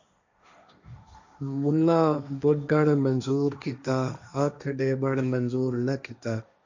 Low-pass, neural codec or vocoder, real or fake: 7.2 kHz; codec, 16 kHz, 1.1 kbps, Voila-Tokenizer; fake